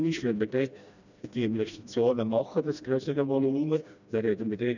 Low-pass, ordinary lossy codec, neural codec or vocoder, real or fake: 7.2 kHz; none; codec, 16 kHz, 1 kbps, FreqCodec, smaller model; fake